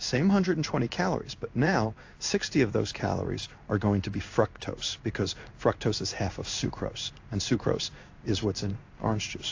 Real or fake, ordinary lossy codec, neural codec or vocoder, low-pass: fake; AAC, 48 kbps; codec, 16 kHz in and 24 kHz out, 1 kbps, XY-Tokenizer; 7.2 kHz